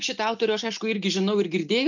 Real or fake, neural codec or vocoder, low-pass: real; none; 7.2 kHz